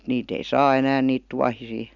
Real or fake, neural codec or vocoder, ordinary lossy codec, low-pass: real; none; none; 7.2 kHz